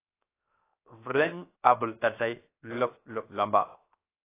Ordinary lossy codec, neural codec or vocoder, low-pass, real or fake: AAC, 24 kbps; codec, 16 kHz, 0.7 kbps, FocalCodec; 3.6 kHz; fake